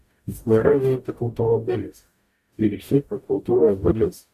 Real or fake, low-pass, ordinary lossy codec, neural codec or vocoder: fake; 14.4 kHz; MP3, 64 kbps; codec, 44.1 kHz, 0.9 kbps, DAC